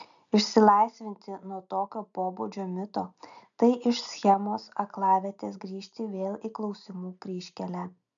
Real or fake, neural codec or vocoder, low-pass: real; none; 7.2 kHz